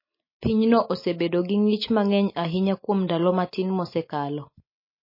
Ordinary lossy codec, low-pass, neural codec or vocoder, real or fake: MP3, 24 kbps; 5.4 kHz; none; real